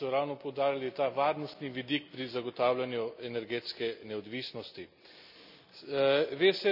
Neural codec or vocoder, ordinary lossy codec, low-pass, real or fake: none; none; 5.4 kHz; real